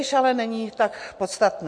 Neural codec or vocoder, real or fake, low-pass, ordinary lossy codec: none; real; 9.9 kHz; MP3, 48 kbps